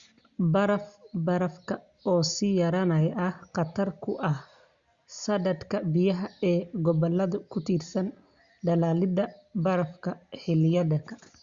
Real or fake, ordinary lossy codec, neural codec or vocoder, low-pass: real; Opus, 64 kbps; none; 7.2 kHz